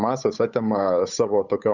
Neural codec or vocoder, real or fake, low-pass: codec, 16 kHz, 8 kbps, FunCodec, trained on Chinese and English, 25 frames a second; fake; 7.2 kHz